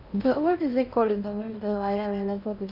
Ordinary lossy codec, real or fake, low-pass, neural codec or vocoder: none; fake; 5.4 kHz; codec, 16 kHz in and 24 kHz out, 0.8 kbps, FocalCodec, streaming, 65536 codes